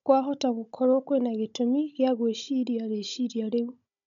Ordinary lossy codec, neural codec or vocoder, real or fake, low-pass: none; codec, 16 kHz, 16 kbps, FunCodec, trained on Chinese and English, 50 frames a second; fake; 7.2 kHz